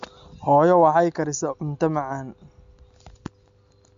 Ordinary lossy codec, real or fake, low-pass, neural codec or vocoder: MP3, 64 kbps; real; 7.2 kHz; none